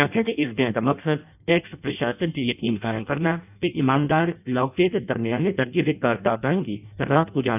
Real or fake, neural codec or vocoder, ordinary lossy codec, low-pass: fake; codec, 16 kHz in and 24 kHz out, 0.6 kbps, FireRedTTS-2 codec; none; 3.6 kHz